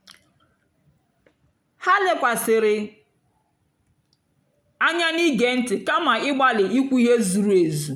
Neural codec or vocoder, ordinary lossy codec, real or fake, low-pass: none; none; real; 19.8 kHz